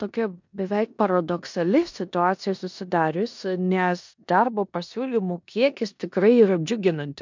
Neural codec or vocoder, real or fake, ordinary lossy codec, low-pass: codec, 16 kHz in and 24 kHz out, 0.9 kbps, LongCat-Audio-Codec, four codebook decoder; fake; MP3, 64 kbps; 7.2 kHz